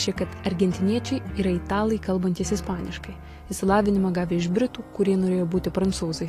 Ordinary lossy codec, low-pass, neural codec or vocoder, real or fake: AAC, 48 kbps; 14.4 kHz; none; real